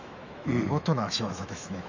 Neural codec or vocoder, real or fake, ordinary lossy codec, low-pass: autoencoder, 48 kHz, 128 numbers a frame, DAC-VAE, trained on Japanese speech; fake; none; 7.2 kHz